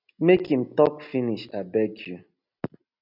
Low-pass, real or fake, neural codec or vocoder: 5.4 kHz; real; none